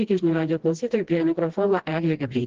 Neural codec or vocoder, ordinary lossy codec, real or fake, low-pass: codec, 16 kHz, 1 kbps, FreqCodec, smaller model; Opus, 16 kbps; fake; 7.2 kHz